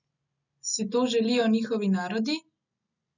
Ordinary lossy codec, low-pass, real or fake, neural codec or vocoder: none; 7.2 kHz; real; none